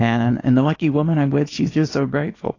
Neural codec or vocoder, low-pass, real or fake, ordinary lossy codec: codec, 24 kHz, 0.9 kbps, WavTokenizer, small release; 7.2 kHz; fake; AAC, 32 kbps